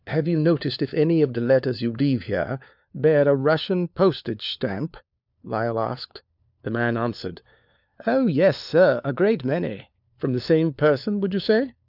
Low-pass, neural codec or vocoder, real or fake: 5.4 kHz; codec, 16 kHz, 4 kbps, FunCodec, trained on LibriTTS, 50 frames a second; fake